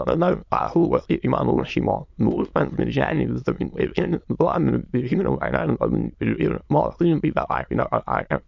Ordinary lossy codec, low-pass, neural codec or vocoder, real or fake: MP3, 64 kbps; 7.2 kHz; autoencoder, 22.05 kHz, a latent of 192 numbers a frame, VITS, trained on many speakers; fake